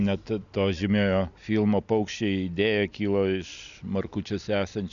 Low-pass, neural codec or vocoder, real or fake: 7.2 kHz; none; real